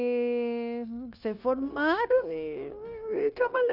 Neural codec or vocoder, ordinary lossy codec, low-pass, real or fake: codec, 16 kHz, 0.9 kbps, LongCat-Audio-Codec; none; 5.4 kHz; fake